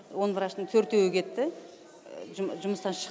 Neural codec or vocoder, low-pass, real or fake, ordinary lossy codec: none; none; real; none